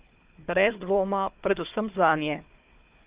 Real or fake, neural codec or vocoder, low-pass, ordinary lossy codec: fake; codec, 16 kHz, 4 kbps, FunCodec, trained on Chinese and English, 50 frames a second; 3.6 kHz; Opus, 32 kbps